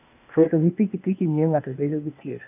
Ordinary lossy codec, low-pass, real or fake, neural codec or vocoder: none; 3.6 kHz; fake; codec, 16 kHz, 0.8 kbps, ZipCodec